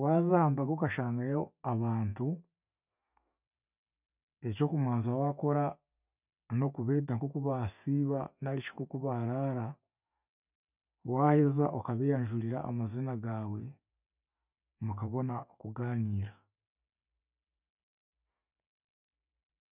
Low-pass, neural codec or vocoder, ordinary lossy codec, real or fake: 3.6 kHz; none; none; real